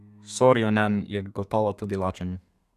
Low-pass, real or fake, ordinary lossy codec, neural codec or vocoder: 14.4 kHz; fake; none; codec, 32 kHz, 1.9 kbps, SNAC